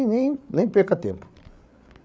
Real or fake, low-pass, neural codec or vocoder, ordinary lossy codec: fake; none; codec, 16 kHz, 8 kbps, FreqCodec, larger model; none